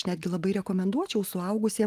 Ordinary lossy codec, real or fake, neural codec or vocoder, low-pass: Opus, 24 kbps; real; none; 14.4 kHz